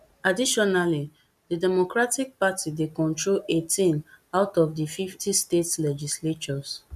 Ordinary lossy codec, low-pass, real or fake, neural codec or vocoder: none; 14.4 kHz; real; none